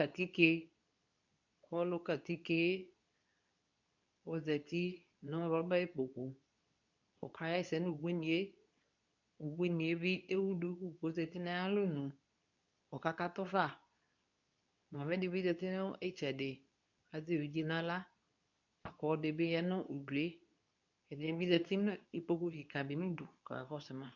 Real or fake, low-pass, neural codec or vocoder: fake; 7.2 kHz; codec, 24 kHz, 0.9 kbps, WavTokenizer, medium speech release version 2